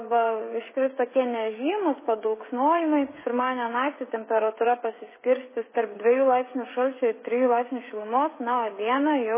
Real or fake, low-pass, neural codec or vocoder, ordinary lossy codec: fake; 3.6 kHz; codec, 16 kHz, 16 kbps, FreqCodec, smaller model; MP3, 16 kbps